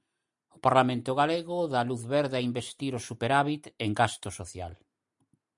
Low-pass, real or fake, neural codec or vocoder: 10.8 kHz; real; none